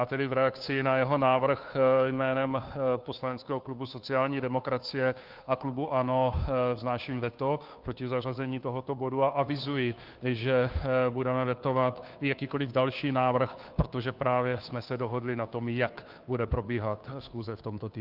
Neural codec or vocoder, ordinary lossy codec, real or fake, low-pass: codec, 16 kHz, 2 kbps, FunCodec, trained on Chinese and English, 25 frames a second; Opus, 24 kbps; fake; 5.4 kHz